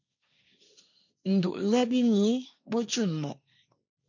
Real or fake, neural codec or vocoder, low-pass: fake; codec, 16 kHz, 1.1 kbps, Voila-Tokenizer; 7.2 kHz